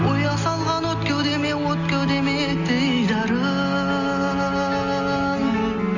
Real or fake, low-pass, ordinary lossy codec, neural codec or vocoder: real; 7.2 kHz; none; none